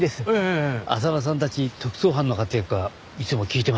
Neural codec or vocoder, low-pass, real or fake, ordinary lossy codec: none; none; real; none